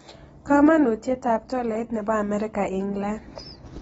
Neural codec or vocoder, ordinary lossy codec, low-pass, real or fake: none; AAC, 24 kbps; 14.4 kHz; real